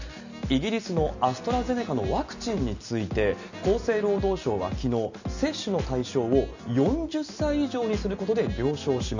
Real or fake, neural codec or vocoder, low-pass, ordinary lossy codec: real; none; 7.2 kHz; none